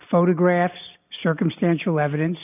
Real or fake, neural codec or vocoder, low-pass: real; none; 3.6 kHz